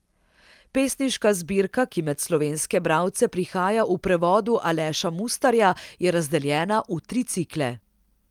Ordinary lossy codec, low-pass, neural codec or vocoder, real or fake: Opus, 32 kbps; 19.8 kHz; none; real